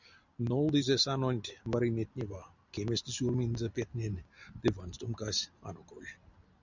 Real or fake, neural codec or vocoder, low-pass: real; none; 7.2 kHz